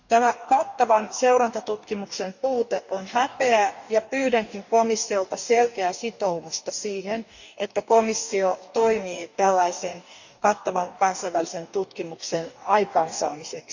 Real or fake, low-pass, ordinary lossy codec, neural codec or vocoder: fake; 7.2 kHz; none; codec, 44.1 kHz, 2.6 kbps, DAC